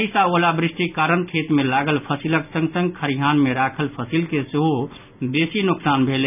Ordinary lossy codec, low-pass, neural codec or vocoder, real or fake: none; 3.6 kHz; none; real